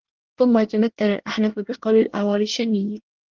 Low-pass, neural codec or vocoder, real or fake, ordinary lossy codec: 7.2 kHz; codec, 24 kHz, 1 kbps, SNAC; fake; Opus, 16 kbps